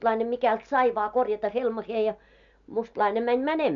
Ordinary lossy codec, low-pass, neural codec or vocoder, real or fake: none; 7.2 kHz; none; real